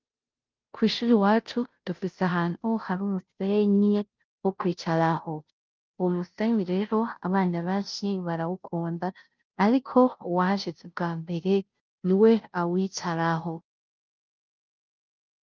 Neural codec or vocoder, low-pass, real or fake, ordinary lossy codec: codec, 16 kHz, 0.5 kbps, FunCodec, trained on Chinese and English, 25 frames a second; 7.2 kHz; fake; Opus, 24 kbps